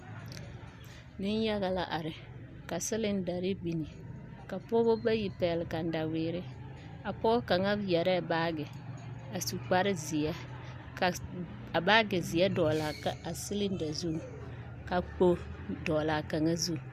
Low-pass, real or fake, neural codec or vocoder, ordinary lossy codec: 14.4 kHz; real; none; Opus, 64 kbps